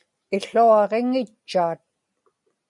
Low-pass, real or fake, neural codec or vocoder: 10.8 kHz; real; none